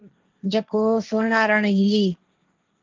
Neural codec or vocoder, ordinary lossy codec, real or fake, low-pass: codec, 16 kHz, 1.1 kbps, Voila-Tokenizer; Opus, 24 kbps; fake; 7.2 kHz